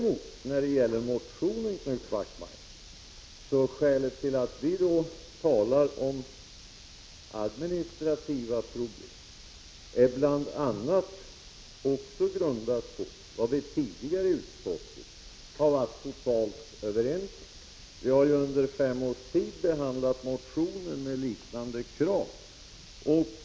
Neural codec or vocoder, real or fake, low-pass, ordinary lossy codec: none; real; none; none